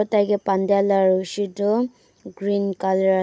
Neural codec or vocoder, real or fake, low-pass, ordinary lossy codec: none; real; none; none